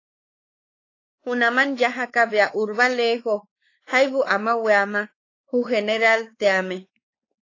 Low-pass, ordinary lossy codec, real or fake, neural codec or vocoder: 7.2 kHz; AAC, 32 kbps; fake; codec, 16 kHz, 4 kbps, X-Codec, WavLM features, trained on Multilingual LibriSpeech